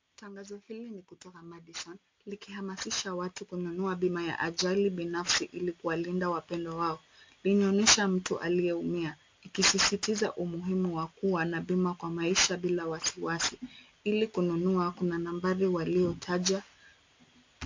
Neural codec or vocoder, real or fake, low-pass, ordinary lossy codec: none; real; 7.2 kHz; MP3, 48 kbps